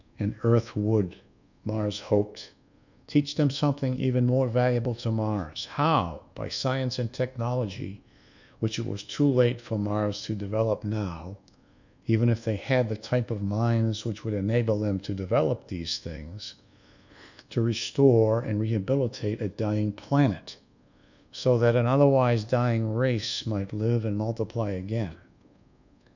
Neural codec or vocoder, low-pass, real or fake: codec, 24 kHz, 1.2 kbps, DualCodec; 7.2 kHz; fake